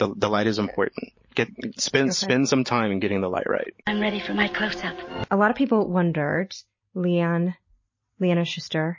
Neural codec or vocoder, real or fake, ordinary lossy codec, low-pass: none; real; MP3, 32 kbps; 7.2 kHz